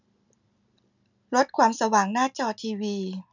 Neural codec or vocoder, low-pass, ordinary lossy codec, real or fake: none; 7.2 kHz; none; real